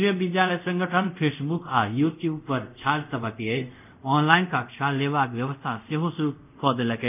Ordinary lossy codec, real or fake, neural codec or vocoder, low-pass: none; fake; codec, 24 kHz, 0.5 kbps, DualCodec; 3.6 kHz